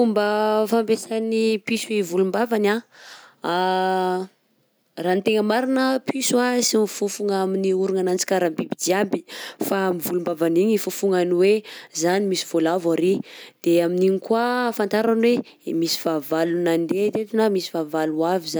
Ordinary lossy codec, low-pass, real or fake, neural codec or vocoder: none; none; real; none